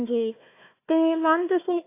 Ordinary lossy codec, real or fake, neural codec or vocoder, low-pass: AAC, 24 kbps; fake; codec, 16 kHz, 1 kbps, FunCodec, trained on Chinese and English, 50 frames a second; 3.6 kHz